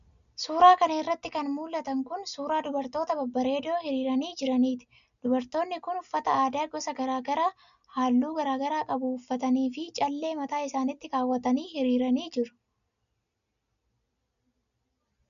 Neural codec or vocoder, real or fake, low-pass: none; real; 7.2 kHz